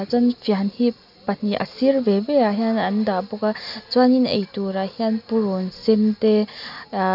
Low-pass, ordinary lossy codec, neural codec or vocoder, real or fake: 5.4 kHz; none; none; real